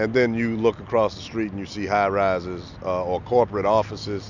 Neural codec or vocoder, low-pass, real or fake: none; 7.2 kHz; real